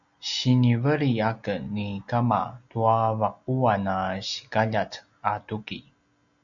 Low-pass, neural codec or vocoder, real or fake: 7.2 kHz; none; real